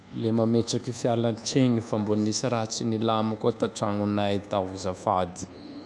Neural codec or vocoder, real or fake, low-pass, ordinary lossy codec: codec, 24 kHz, 1.2 kbps, DualCodec; fake; none; none